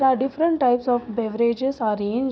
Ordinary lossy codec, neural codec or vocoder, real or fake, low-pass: none; none; real; none